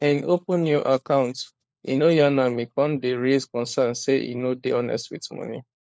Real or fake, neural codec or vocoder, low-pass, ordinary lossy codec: fake; codec, 16 kHz, 4 kbps, FunCodec, trained on LibriTTS, 50 frames a second; none; none